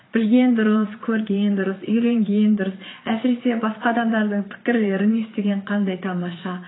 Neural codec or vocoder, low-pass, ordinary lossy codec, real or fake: vocoder, 22.05 kHz, 80 mel bands, Vocos; 7.2 kHz; AAC, 16 kbps; fake